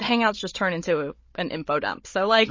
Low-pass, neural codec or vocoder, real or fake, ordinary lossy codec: 7.2 kHz; codec, 16 kHz, 16 kbps, FunCodec, trained on Chinese and English, 50 frames a second; fake; MP3, 32 kbps